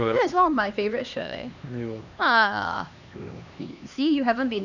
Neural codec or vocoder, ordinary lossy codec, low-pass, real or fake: codec, 16 kHz, 2 kbps, X-Codec, HuBERT features, trained on LibriSpeech; none; 7.2 kHz; fake